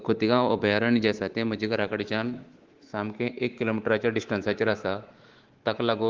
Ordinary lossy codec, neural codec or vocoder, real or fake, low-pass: Opus, 16 kbps; codec, 24 kHz, 3.1 kbps, DualCodec; fake; 7.2 kHz